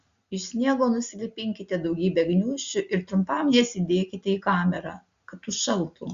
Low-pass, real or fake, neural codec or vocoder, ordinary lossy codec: 7.2 kHz; real; none; Opus, 64 kbps